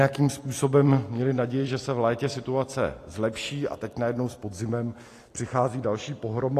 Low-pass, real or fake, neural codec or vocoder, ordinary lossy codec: 14.4 kHz; real; none; AAC, 48 kbps